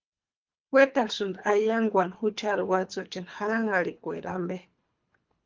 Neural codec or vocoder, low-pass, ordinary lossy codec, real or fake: codec, 24 kHz, 3 kbps, HILCodec; 7.2 kHz; Opus, 32 kbps; fake